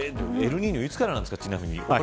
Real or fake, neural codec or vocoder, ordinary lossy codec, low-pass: real; none; none; none